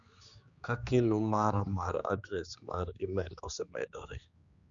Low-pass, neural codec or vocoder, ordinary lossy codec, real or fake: 7.2 kHz; codec, 16 kHz, 2 kbps, X-Codec, HuBERT features, trained on general audio; Opus, 64 kbps; fake